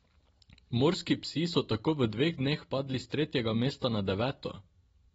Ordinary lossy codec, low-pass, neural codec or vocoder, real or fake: AAC, 24 kbps; 19.8 kHz; none; real